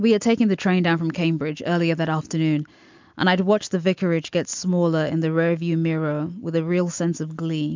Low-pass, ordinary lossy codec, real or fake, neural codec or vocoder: 7.2 kHz; MP3, 64 kbps; real; none